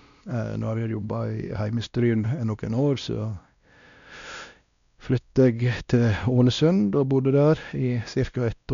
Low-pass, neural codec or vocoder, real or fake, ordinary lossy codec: 7.2 kHz; codec, 16 kHz, 1 kbps, X-Codec, WavLM features, trained on Multilingual LibriSpeech; fake; MP3, 96 kbps